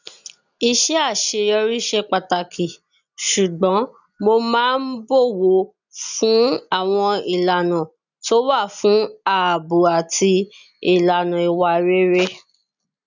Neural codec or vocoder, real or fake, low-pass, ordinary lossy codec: none; real; 7.2 kHz; none